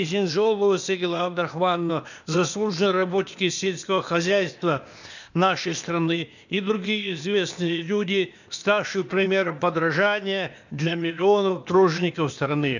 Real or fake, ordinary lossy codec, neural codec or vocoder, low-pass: fake; none; codec, 16 kHz, 0.8 kbps, ZipCodec; 7.2 kHz